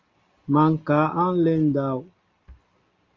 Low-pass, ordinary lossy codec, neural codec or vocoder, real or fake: 7.2 kHz; Opus, 32 kbps; none; real